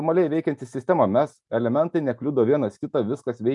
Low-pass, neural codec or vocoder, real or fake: 10.8 kHz; none; real